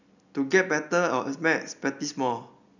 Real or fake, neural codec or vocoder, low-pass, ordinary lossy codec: real; none; 7.2 kHz; none